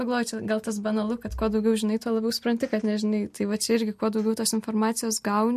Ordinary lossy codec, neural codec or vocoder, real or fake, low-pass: MP3, 64 kbps; none; real; 14.4 kHz